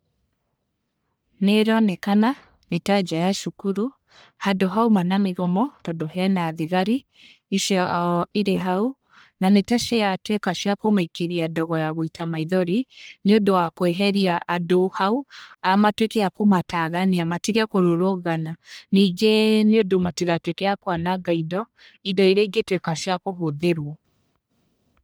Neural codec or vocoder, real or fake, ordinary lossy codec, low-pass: codec, 44.1 kHz, 1.7 kbps, Pupu-Codec; fake; none; none